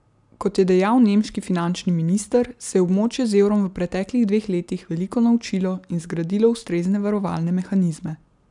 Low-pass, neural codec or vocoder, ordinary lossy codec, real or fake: 10.8 kHz; none; none; real